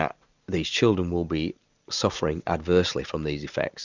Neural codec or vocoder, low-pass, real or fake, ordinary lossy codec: none; 7.2 kHz; real; Opus, 64 kbps